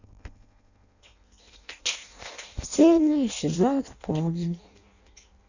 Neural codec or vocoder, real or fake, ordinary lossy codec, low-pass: codec, 16 kHz in and 24 kHz out, 0.6 kbps, FireRedTTS-2 codec; fake; none; 7.2 kHz